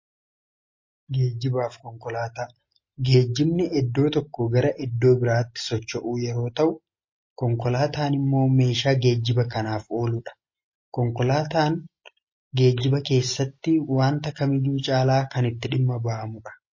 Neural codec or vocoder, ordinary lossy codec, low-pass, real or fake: none; MP3, 32 kbps; 7.2 kHz; real